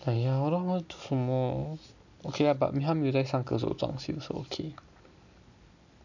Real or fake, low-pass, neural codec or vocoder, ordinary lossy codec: real; 7.2 kHz; none; none